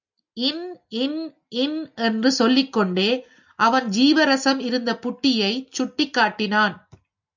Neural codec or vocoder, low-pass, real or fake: none; 7.2 kHz; real